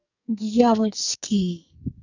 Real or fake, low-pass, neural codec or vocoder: fake; 7.2 kHz; codec, 44.1 kHz, 2.6 kbps, SNAC